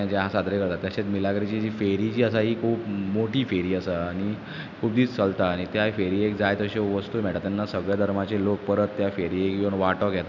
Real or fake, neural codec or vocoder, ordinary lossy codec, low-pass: real; none; none; 7.2 kHz